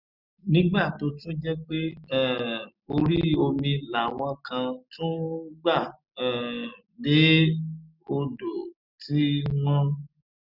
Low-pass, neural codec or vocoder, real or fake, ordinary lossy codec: 5.4 kHz; none; real; none